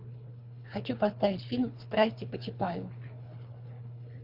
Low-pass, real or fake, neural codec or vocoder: 5.4 kHz; fake; codec, 24 kHz, 3 kbps, HILCodec